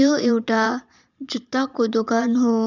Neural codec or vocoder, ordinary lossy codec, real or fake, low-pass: vocoder, 22.05 kHz, 80 mel bands, Vocos; none; fake; 7.2 kHz